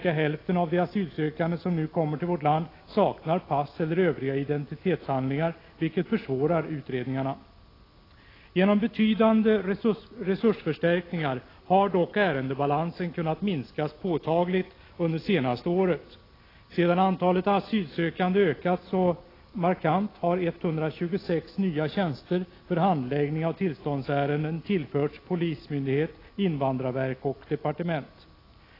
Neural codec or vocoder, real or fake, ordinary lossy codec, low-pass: none; real; AAC, 24 kbps; 5.4 kHz